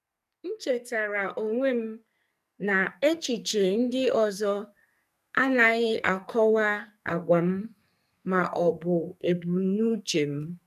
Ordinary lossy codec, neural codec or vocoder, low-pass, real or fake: none; codec, 44.1 kHz, 2.6 kbps, SNAC; 14.4 kHz; fake